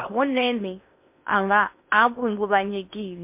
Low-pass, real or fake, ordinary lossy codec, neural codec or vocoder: 3.6 kHz; fake; MP3, 32 kbps; codec, 16 kHz in and 24 kHz out, 0.6 kbps, FocalCodec, streaming, 2048 codes